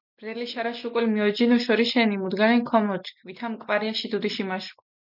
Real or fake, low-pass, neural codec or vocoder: real; 5.4 kHz; none